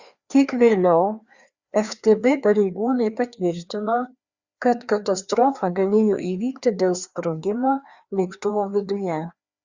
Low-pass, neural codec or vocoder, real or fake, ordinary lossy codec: 7.2 kHz; codec, 16 kHz, 2 kbps, FreqCodec, larger model; fake; Opus, 64 kbps